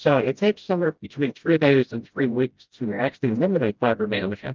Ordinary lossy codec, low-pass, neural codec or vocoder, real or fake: Opus, 24 kbps; 7.2 kHz; codec, 16 kHz, 0.5 kbps, FreqCodec, smaller model; fake